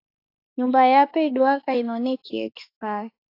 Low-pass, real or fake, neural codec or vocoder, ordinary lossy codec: 5.4 kHz; fake; autoencoder, 48 kHz, 32 numbers a frame, DAC-VAE, trained on Japanese speech; AAC, 32 kbps